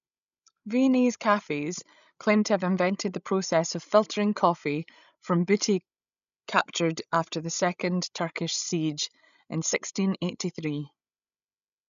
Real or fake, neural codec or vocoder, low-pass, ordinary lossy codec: fake; codec, 16 kHz, 16 kbps, FreqCodec, larger model; 7.2 kHz; none